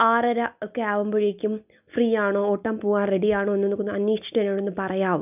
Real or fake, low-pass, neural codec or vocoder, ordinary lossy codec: real; 3.6 kHz; none; none